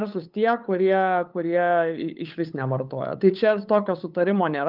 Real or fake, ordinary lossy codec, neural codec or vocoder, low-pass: fake; Opus, 32 kbps; codec, 16 kHz, 8 kbps, FunCodec, trained on LibriTTS, 25 frames a second; 5.4 kHz